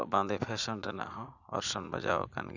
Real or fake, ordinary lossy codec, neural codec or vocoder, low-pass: fake; none; vocoder, 44.1 kHz, 80 mel bands, Vocos; 7.2 kHz